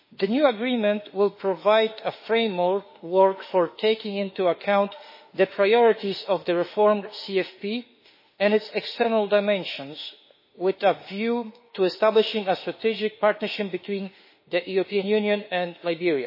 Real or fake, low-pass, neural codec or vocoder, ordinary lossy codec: fake; 5.4 kHz; autoencoder, 48 kHz, 32 numbers a frame, DAC-VAE, trained on Japanese speech; MP3, 24 kbps